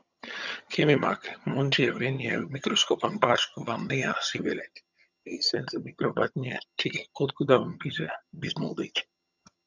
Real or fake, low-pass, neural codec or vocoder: fake; 7.2 kHz; vocoder, 22.05 kHz, 80 mel bands, HiFi-GAN